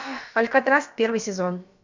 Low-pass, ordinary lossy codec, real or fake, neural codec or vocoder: 7.2 kHz; MP3, 64 kbps; fake; codec, 16 kHz, about 1 kbps, DyCAST, with the encoder's durations